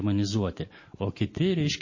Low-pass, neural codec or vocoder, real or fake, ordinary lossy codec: 7.2 kHz; none; real; MP3, 32 kbps